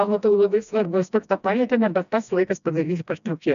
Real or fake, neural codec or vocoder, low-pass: fake; codec, 16 kHz, 1 kbps, FreqCodec, smaller model; 7.2 kHz